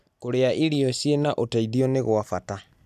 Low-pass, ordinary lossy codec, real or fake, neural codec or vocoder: 14.4 kHz; none; real; none